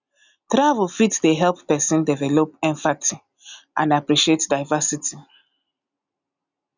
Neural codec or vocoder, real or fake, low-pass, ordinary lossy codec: none; real; 7.2 kHz; none